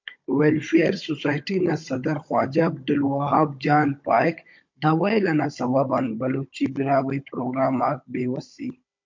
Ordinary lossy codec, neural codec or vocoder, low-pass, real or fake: MP3, 48 kbps; codec, 16 kHz, 16 kbps, FunCodec, trained on Chinese and English, 50 frames a second; 7.2 kHz; fake